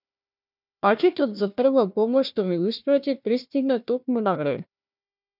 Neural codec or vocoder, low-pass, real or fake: codec, 16 kHz, 1 kbps, FunCodec, trained on Chinese and English, 50 frames a second; 5.4 kHz; fake